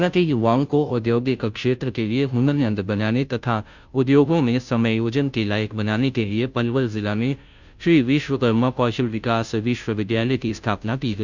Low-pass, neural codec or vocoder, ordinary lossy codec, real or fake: 7.2 kHz; codec, 16 kHz, 0.5 kbps, FunCodec, trained on Chinese and English, 25 frames a second; none; fake